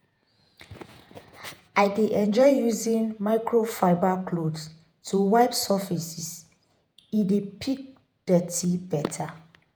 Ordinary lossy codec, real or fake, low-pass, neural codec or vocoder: none; fake; none; vocoder, 48 kHz, 128 mel bands, Vocos